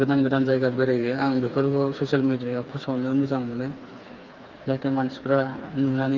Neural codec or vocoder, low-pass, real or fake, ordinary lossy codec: codec, 16 kHz, 4 kbps, FreqCodec, smaller model; 7.2 kHz; fake; Opus, 32 kbps